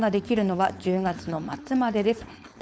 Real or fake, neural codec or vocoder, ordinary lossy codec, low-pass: fake; codec, 16 kHz, 4.8 kbps, FACodec; none; none